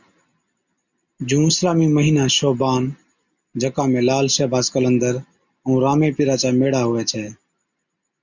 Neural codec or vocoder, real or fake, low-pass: none; real; 7.2 kHz